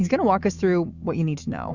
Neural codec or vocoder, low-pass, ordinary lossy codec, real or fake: autoencoder, 48 kHz, 128 numbers a frame, DAC-VAE, trained on Japanese speech; 7.2 kHz; Opus, 64 kbps; fake